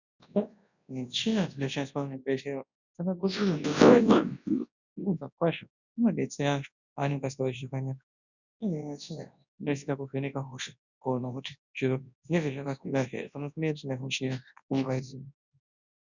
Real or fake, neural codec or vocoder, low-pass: fake; codec, 24 kHz, 0.9 kbps, WavTokenizer, large speech release; 7.2 kHz